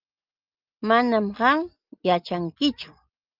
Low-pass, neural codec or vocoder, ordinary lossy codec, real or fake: 5.4 kHz; none; Opus, 24 kbps; real